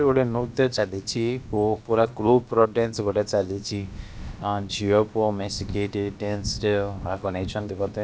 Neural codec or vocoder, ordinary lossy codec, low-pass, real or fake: codec, 16 kHz, about 1 kbps, DyCAST, with the encoder's durations; none; none; fake